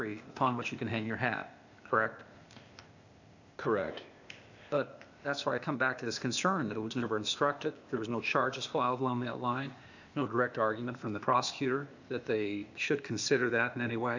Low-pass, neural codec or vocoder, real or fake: 7.2 kHz; codec, 16 kHz, 0.8 kbps, ZipCodec; fake